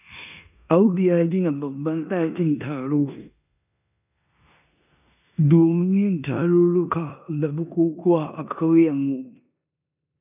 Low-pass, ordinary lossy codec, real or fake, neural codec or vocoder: 3.6 kHz; none; fake; codec, 16 kHz in and 24 kHz out, 0.9 kbps, LongCat-Audio-Codec, four codebook decoder